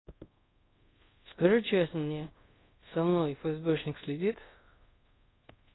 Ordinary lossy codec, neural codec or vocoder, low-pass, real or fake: AAC, 16 kbps; codec, 24 kHz, 0.5 kbps, DualCodec; 7.2 kHz; fake